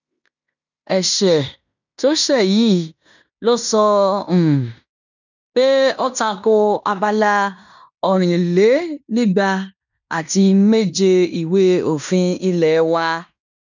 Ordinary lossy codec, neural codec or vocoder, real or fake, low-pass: none; codec, 16 kHz in and 24 kHz out, 0.9 kbps, LongCat-Audio-Codec, fine tuned four codebook decoder; fake; 7.2 kHz